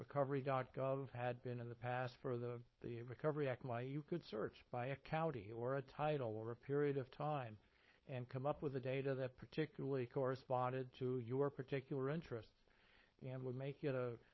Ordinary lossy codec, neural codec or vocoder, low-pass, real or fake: MP3, 24 kbps; codec, 16 kHz, 4.8 kbps, FACodec; 7.2 kHz; fake